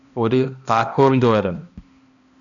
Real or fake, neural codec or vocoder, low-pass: fake; codec, 16 kHz, 1 kbps, X-Codec, HuBERT features, trained on balanced general audio; 7.2 kHz